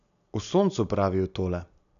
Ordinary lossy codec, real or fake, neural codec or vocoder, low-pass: none; real; none; 7.2 kHz